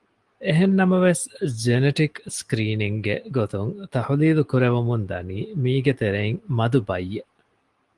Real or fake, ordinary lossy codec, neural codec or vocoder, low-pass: real; Opus, 24 kbps; none; 10.8 kHz